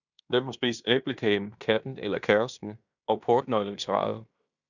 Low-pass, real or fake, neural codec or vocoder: 7.2 kHz; fake; codec, 16 kHz in and 24 kHz out, 0.9 kbps, LongCat-Audio-Codec, fine tuned four codebook decoder